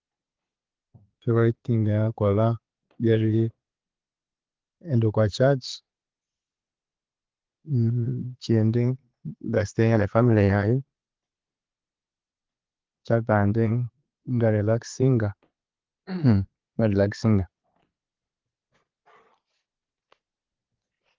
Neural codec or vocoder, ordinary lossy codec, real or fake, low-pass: vocoder, 22.05 kHz, 80 mel bands, Vocos; Opus, 16 kbps; fake; 7.2 kHz